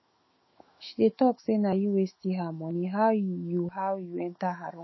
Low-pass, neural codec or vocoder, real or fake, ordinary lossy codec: 7.2 kHz; autoencoder, 48 kHz, 128 numbers a frame, DAC-VAE, trained on Japanese speech; fake; MP3, 24 kbps